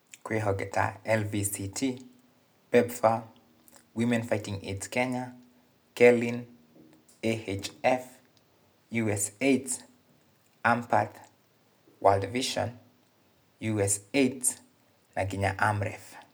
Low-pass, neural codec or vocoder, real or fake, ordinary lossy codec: none; none; real; none